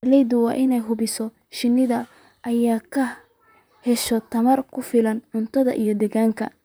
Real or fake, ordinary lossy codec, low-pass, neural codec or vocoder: fake; none; none; vocoder, 44.1 kHz, 128 mel bands, Pupu-Vocoder